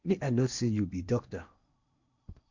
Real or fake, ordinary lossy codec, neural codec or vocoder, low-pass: fake; Opus, 64 kbps; codec, 16 kHz in and 24 kHz out, 0.8 kbps, FocalCodec, streaming, 65536 codes; 7.2 kHz